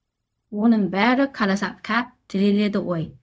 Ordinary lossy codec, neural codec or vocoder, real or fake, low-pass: none; codec, 16 kHz, 0.4 kbps, LongCat-Audio-Codec; fake; none